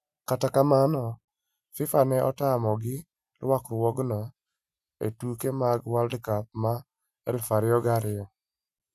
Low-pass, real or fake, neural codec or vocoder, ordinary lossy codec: 14.4 kHz; real; none; none